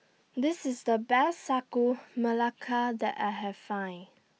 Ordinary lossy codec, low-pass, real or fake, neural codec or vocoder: none; none; real; none